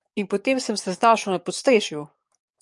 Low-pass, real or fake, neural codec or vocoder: 10.8 kHz; fake; codec, 44.1 kHz, 7.8 kbps, DAC